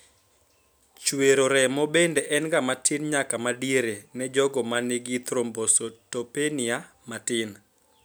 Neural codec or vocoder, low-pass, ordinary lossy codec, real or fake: none; none; none; real